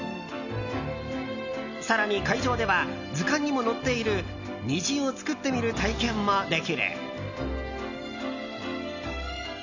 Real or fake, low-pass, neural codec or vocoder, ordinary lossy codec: real; 7.2 kHz; none; none